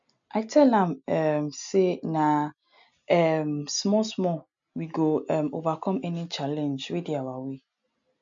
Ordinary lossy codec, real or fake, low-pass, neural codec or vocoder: MP3, 64 kbps; real; 7.2 kHz; none